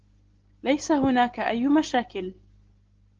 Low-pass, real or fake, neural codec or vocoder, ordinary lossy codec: 7.2 kHz; real; none; Opus, 16 kbps